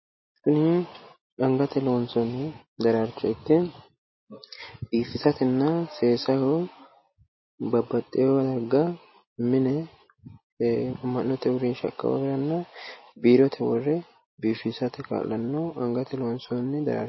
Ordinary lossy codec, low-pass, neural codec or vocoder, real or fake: MP3, 24 kbps; 7.2 kHz; none; real